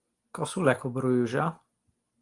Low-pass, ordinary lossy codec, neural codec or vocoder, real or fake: 10.8 kHz; Opus, 24 kbps; none; real